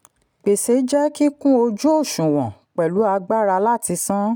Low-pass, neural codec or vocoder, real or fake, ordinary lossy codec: none; none; real; none